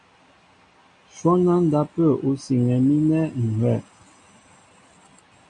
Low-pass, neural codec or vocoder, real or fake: 9.9 kHz; none; real